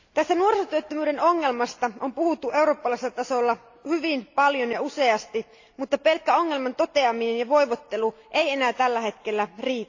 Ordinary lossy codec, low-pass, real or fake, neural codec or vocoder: AAC, 48 kbps; 7.2 kHz; real; none